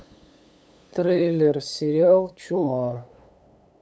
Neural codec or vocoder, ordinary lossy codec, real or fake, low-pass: codec, 16 kHz, 8 kbps, FunCodec, trained on LibriTTS, 25 frames a second; none; fake; none